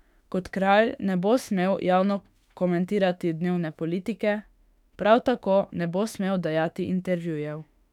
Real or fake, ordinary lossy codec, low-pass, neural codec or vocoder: fake; none; 19.8 kHz; autoencoder, 48 kHz, 32 numbers a frame, DAC-VAE, trained on Japanese speech